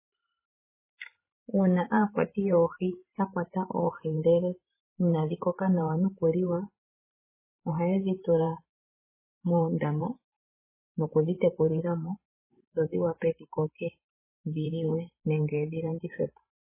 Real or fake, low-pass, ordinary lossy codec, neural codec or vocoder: fake; 3.6 kHz; MP3, 16 kbps; vocoder, 44.1 kHz, 128 mel bands every 512 samples, BigVGAN v2